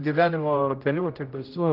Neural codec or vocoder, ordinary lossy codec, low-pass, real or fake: codec, 16 kHz, 0.5 kbps, X-Codec, HuBERT features, trained on general audio; Opus, 32 kbps; 5.4 kHz; fake